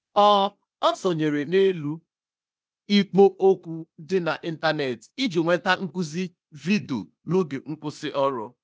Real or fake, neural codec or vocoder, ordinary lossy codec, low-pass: fake; codec, 16 kHz, 0.8 kbps, ZipCodec; none; none